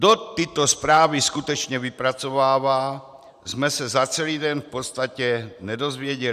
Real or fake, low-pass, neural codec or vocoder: fake; 14.4 kHz; vocoder, 44.1 kHz, 128 mel bands every 512 samples, BigVGAN v2